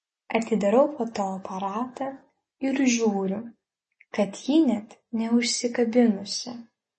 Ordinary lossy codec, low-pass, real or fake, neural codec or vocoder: MP3, 32 kbps; 10.8 kHz; real; none